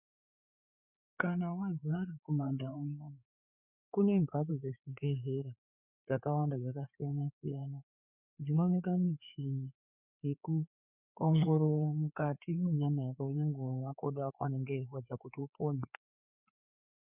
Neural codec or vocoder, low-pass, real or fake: codec, 16 kHz, 4 kbps, FreqCodec, larger model; 3.6 kHz; fake